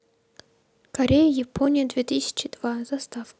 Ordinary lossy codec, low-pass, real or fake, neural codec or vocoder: none; none; real; none